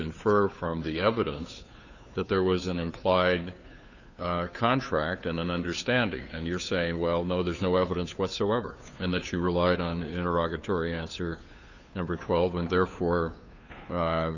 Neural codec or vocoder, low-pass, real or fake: codec, 16 kHz, 2 kbps, FunCodec, trained on Chinese and English, 25 frames a second; 7.2 kHz; fake